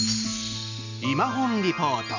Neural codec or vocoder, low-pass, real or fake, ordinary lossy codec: none; 7.2 kHz; real; none